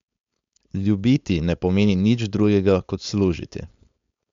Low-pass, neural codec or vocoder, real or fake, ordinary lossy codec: 7.2 kHz; codec, 16 kHz, 4.8 kbps, FACodec; fake; MP3, 64 kbps